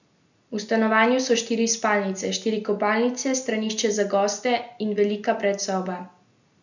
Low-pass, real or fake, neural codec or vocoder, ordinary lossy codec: 7.2 kHz; real; none; none